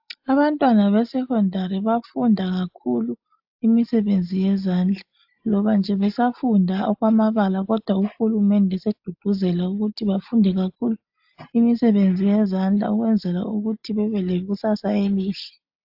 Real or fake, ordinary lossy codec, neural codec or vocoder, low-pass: real; AAC, 48 kbps; none; 5.4 kHz